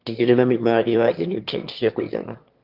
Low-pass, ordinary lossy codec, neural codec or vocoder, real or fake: 5.4 kHz; Opus, 32 kbps; autoencoder, 22.05 kHz, a latent of 192 numbers a frame, VITS, trained on one speaker; fake